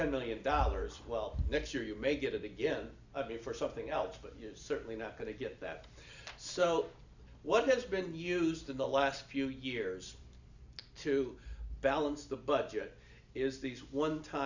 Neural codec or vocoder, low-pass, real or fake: none; 7.2 kHz; real